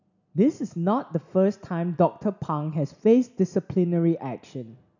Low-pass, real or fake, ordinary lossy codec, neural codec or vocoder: 7.2 kHz; real; none; none